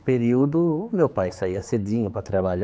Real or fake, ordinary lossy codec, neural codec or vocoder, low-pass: fake; none; codec, 16 kHz, 4 kbps, X-Codec, HuBERT features, trained on general audio; none